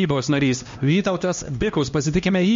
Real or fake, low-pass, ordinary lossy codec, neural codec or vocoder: fake; 7.2 kHz; MP3, 48 kbps; codec, 16 kHz, 1 kbps, X-Codec, HuBERT features, trained on LibriSpeech